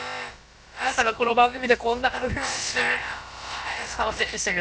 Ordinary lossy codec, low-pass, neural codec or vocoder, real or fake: none; none; codec, 16 kHz, about 1 kbps, DyCAST, with the encoder's durations; fake